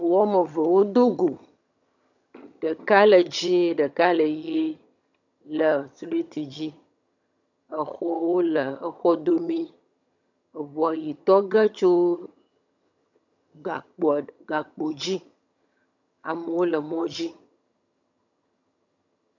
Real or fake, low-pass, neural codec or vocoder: fake; 7.2 kHz; vocoder, 22.05 kHz, 80 mel bands, HiFi-GAN